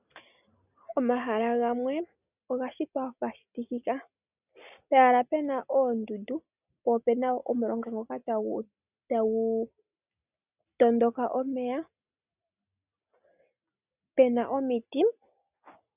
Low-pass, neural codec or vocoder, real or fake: 3.6 kHz; none; real